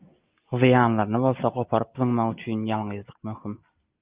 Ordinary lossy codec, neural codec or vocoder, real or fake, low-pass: Opus, 32 kbps; none; real; 3.6 kHz